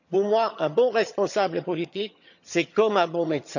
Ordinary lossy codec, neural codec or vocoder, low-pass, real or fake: none; vocoder, 22.05 kHz, 80 mel bands, HiFi-GAN; 7.2 kHz; fake